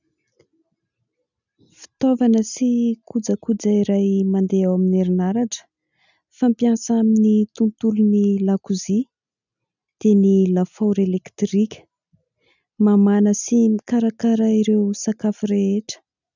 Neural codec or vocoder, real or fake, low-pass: none; real; 7.2 kHz